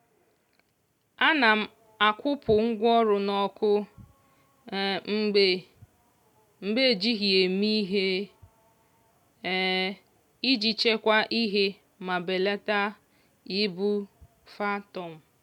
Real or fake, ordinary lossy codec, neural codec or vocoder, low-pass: real; none; none; 19.8 kHz